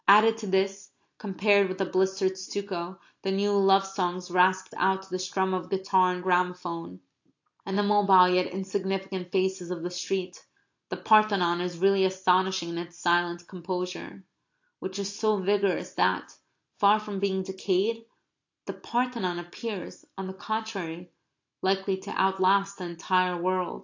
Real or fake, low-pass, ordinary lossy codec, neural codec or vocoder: real; 7.2 kHz; AAC, 48 kbps; none